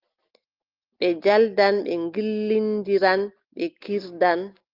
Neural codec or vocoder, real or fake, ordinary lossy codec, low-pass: none; real; Opus, 32 kbps; 5.4 kHz